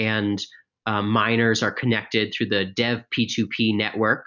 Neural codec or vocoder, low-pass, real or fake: none; 7.2 kHz; real